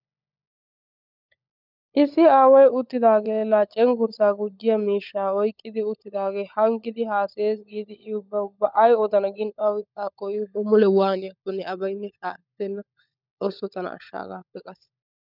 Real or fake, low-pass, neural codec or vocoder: fake; 5.4 kHz; codec, 16 kHz, 16 kbps, FunCodec, trained on LibriTTS, 50 frames a second